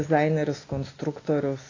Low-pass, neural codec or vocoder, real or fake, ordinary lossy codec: 7.2 kHz; none; real; AAC, 32 kbps